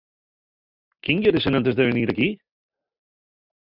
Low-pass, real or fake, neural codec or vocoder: 5.4 kHz; real; none